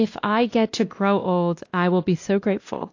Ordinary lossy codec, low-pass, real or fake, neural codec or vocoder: AAC, 48 kbps; 7.2 kHz; fake; codec, 16 kHz, 1 kbps, X-Codec, WavLM features, trained on Multilingual LibriSpeech